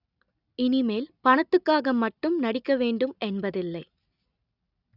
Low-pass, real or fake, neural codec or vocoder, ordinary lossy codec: 5.4 kHz; real; none; none